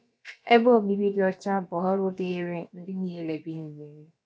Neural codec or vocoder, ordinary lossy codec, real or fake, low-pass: codec, 16 kHz, about 1 kbps, DyCAST, with the encoder's durations; none; fake; none